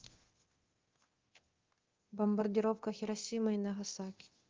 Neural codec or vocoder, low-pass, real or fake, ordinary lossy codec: codec, 24 kHz, 0.9 kbps, DualCodec; 7.2 kHz; fake; Opus, 16 kbps